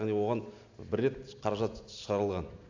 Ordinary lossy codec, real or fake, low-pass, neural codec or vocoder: AAC, 48 kbps; real; 7.2 kHz; none